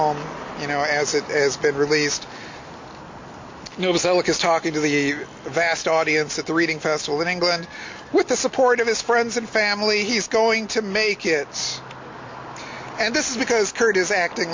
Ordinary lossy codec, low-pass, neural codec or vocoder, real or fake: MP3, 48 kbps; 7.2 kHz; none; real